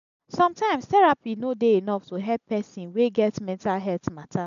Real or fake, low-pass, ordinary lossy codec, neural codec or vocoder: real; 7.2 kHz; none; none